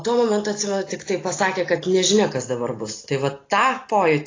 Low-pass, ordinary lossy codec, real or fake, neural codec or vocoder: 7.2 kHz; AAC, 32 kbps; real; none